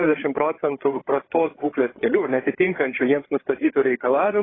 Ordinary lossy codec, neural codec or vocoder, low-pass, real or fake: AAC, 16 kbps; codec, 16 kHz, 8 kbps, FreqCodec, larger model; 7.2 kHz; fake